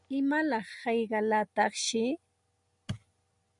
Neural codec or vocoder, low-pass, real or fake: none; 10.8 kHz; real